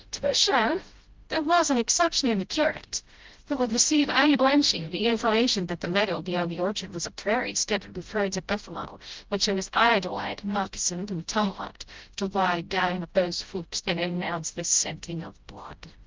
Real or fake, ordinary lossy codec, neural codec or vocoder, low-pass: fake; Opus, 24 kbps; codec, 16 kHz, 0.5 kbps, FreqCodec, smaller model; 7.2 kHz